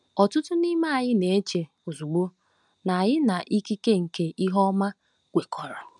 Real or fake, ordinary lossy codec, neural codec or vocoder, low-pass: real; none; none; 10.8 kHz